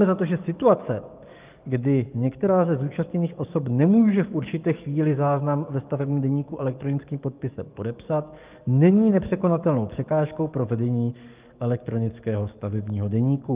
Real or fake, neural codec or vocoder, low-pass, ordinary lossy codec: fake; codec, 44.1 kHz, 7.8 kbps, DAC; 3.6 kHz; Opus, 32 kbps